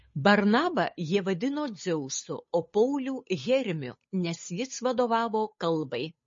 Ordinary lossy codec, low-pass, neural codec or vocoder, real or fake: MP3, 32 kbps; 7.2 kHz; codec, 16 kHz, 8 kbps, FunCodec, trained on Chinese and English, 25 frames a second; fake